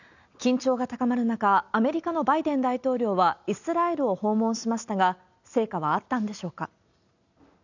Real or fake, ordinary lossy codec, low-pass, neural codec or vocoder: real; none; 7.2 kHz; none